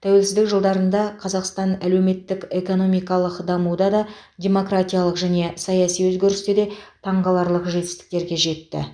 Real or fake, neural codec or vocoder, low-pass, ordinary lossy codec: real; none; 9.9 kHz; none